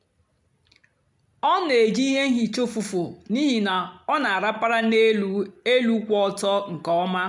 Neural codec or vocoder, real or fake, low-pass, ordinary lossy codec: none; real; 10.8 kHz; none